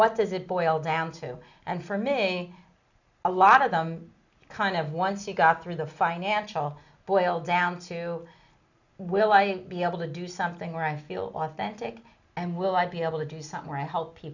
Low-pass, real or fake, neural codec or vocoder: 7.2 kHz; real; none